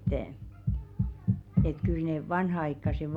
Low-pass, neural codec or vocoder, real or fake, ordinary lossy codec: 19.8 kHz; none; real; none